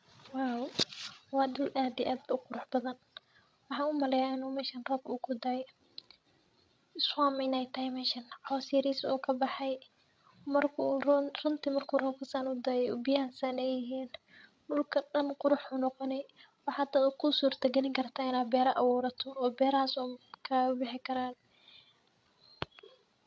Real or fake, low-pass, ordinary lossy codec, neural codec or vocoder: fake; none; none; codec, 16 kHz, 16 kbps, FreqCodec, larger model